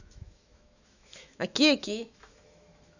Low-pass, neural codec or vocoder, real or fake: 7.2 kHz; autoencoder, 48 kHz, 128 numbers a frame, DAC-VAE, trained on Japanese speech; fake